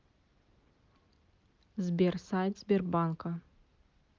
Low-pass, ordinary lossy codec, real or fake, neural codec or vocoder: 7.2 kHz; Opus, 24 kbps; real; none